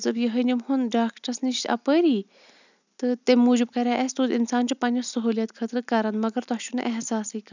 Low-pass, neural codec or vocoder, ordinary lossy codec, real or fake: 7.2 kHz; none; none; real